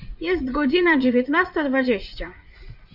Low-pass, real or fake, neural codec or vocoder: 5.4 kHz; fake; vocoder, 44.1 kHz, 80 mel bands, Vocos